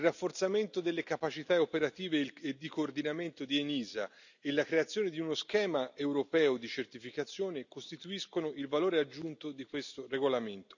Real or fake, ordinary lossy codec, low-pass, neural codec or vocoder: real; none; 7.2 kHz; none